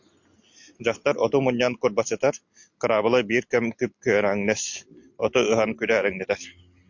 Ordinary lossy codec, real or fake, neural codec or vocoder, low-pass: MP3, 48 kbps; real; none; 7.2 kHz